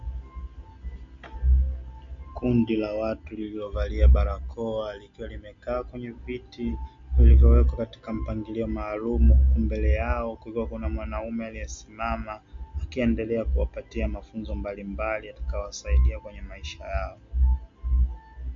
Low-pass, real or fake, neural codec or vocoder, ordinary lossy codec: 7.2 kHz; real; none; MP3, 48 kbps